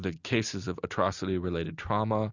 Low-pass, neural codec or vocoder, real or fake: 7.2 kHz; none; real